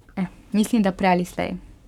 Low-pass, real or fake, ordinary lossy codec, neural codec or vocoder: 19.8 kHz; fake; none; codec, 44.1 kHz, 7.8 kbps, Pupu-Codec